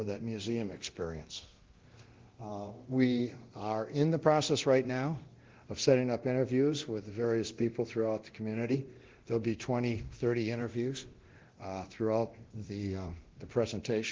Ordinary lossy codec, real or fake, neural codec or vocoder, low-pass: Opus, 16 kbps; fake; codec, 24 kHz, 0.9 kbps, DualCodec; 7.2 kHz